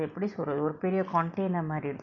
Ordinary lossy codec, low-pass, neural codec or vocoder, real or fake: none; 7.2 kHz; none; real